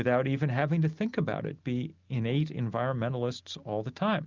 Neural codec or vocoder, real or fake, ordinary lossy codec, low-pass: none; real; Opus, 24 kbps; 7.2 kHz